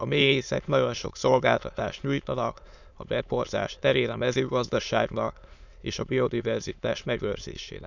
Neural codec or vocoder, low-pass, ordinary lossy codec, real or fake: autoencoder, 22.05 kHz, a latent of 192 numbers a frame, VITS, trained on many speakers; 7.2 kHz; none; fake